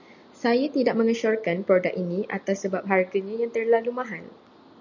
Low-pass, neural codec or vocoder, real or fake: 7.2 kHz; none; real